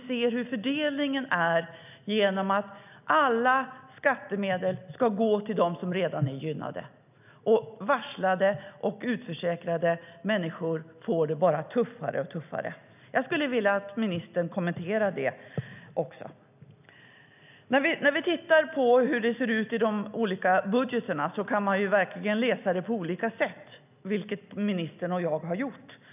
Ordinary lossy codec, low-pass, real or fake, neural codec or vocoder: none; 3.6 kHz; real; none